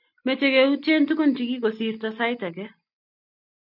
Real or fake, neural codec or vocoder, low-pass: real; none; 5.4 kHz